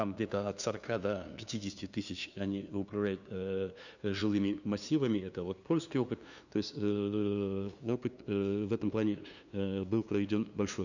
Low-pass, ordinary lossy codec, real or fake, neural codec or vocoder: 7.2 kHz; none; fake; codec, 16 kHz, 2 kbps, FunCodec, trained on LibriTTS, 25 frames a second